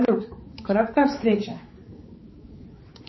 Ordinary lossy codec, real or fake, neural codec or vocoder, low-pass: MP3, 24 kbps; fake; codec, 16 kHz, 4 kbps, X-Codec, HuBERT features, trained on balanced general audio; 7.2 kHz